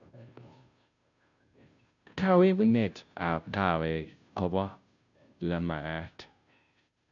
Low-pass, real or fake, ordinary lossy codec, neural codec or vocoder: 7.2 kHz; fake; none; codec, 16 kHz, 0.5 kbps, FunCodec, trained on Chinese and English, 25 frames a second